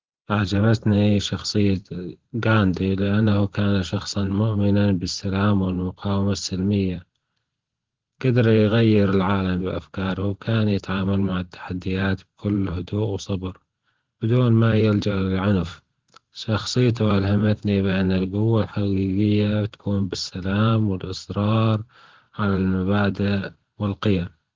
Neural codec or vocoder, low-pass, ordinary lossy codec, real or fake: vocoder, 44.1 kHz, 128 mel bands every 512 samples, BigVGAN v2; 7.2 kHz; Opus, 16 kbps; fake